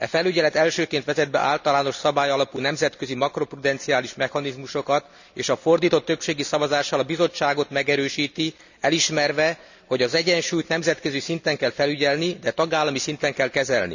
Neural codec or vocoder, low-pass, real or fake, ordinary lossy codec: none; 7.2 kHz; real; none